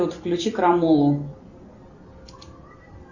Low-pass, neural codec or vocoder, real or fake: 7.2 kHz; none; real